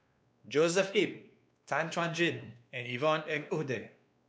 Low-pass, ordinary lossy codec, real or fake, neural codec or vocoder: none; none; fake; codec, 16 kHz, 2 kbps, X-Codec, WavLM features, trained on Multilingual LibriSpeech